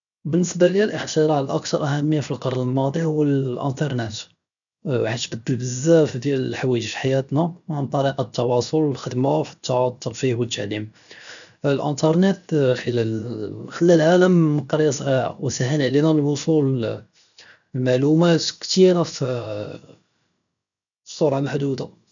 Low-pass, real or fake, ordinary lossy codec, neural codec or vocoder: 7.2 kHz; fake; none; codec, 16 kHz, 0.7 kbps, FocalCodec